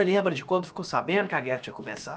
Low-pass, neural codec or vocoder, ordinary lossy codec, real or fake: none; codec, 16 kHz, about 1 kbps, DyCAST, with the encoder's durations; none; fake